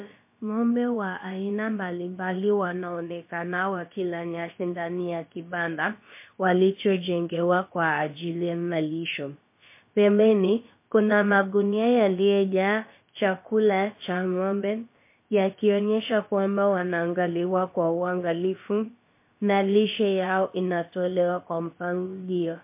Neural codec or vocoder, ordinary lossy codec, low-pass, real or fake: codec, 16 kHz, about 1 kbps, DyCAST, with the encoder's durations; MP3, 24 kbps; 3.6 kHz; fake